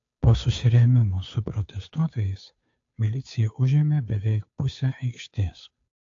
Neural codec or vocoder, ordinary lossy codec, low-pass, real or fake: codec, 16 kHz, 2 kbps, FunCodec, trained on Chinese and English, 25 frames a second; AAC, 48 kbps; 7.2 kHz; fake